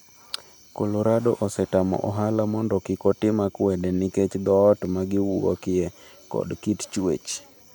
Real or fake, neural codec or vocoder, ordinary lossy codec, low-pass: real; none; none; none